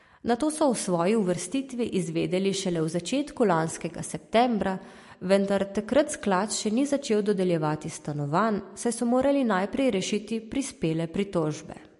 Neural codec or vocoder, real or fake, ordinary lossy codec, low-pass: none; real; MP3, 48 kbps; 14.4 kHz